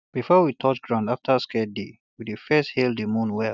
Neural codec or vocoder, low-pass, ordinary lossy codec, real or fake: none; 7.2 kHz; none; real